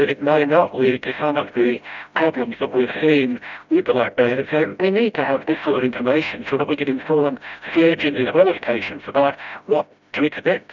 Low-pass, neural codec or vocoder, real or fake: 7.2 kHz; codec, 16 kHz, 0.5 kbps, FreqCodec, smaller model; fake